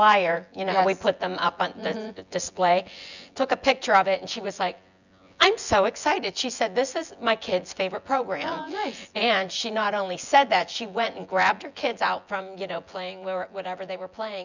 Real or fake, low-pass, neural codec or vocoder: fake; 7.2 kHz; vocoder, 24 kHz, 100 mel bands, Vocos